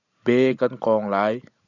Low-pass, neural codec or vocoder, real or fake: 7.2 kHz; none; real